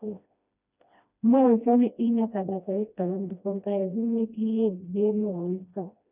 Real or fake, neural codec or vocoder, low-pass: fake; codec, 16 kHz, 1 kbps, FreqCodec, smaller model; 3.6 kHz